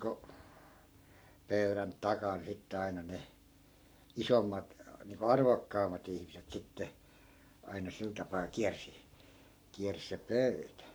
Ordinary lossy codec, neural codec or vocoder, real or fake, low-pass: none; codec, 44.1 kHz, 7.8 kbps, Pupu-Codec; fake; none